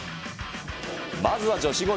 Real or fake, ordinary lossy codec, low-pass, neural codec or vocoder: real; none; none; none